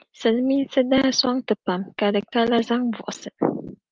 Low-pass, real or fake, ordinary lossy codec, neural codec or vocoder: 7.2 kHz; real; Opus, 32 kbps; none